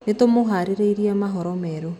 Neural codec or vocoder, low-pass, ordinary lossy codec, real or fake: none; 19.8 kHz; none; real